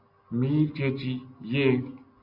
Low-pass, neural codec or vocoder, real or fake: 5.4 kHz; none; real